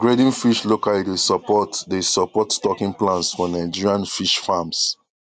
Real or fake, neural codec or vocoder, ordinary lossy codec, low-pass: real; none; none; 10.8 kHz